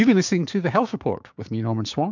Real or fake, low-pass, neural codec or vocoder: fake; 7.2 kHz; codec, 16 kHz, 6 kbps, DAC